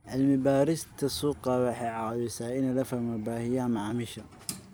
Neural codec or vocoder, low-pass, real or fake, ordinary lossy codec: vocoder, 44.1 kHz, 128 mel bands every 256 samples, BigVGAN v2; none; fake; none